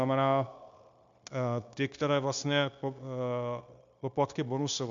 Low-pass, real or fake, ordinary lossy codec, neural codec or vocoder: 7.2 kHz; fake; MP3, 64 kbps; codec, 16 kHz, 0.9 kbps, LongCat-Audio-Codec